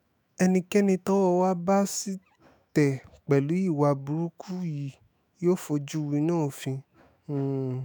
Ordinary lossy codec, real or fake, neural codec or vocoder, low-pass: none; fake; autoencoder, 48 kHz, 128 numbers a frame, DAC-VAE, trained on Japanese speech; none